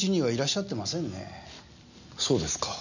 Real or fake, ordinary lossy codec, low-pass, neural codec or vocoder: real; none; 7.2 kHz; none